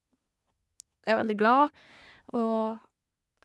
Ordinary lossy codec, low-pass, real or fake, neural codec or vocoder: none; none; fake; codec, 24 kHz, 1 kbps, SNAC